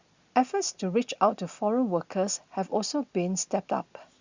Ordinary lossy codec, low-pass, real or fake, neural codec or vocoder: Opus, 64 kbps; 7.2 kHz; real; none